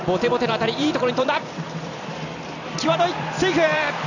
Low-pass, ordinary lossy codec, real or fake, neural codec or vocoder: 7.2 kHz; none; fake; vocoder, 44.1 kHz, 128 mel bands every 256 samples, BigVGAN v2